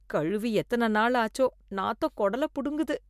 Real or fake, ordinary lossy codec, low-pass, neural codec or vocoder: real; MP3, 96 kbps; 14.4 kHz; none